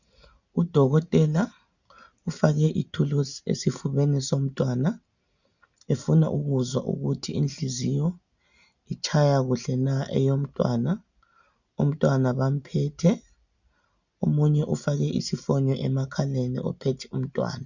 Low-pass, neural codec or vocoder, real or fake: 7.2 kHz; none; real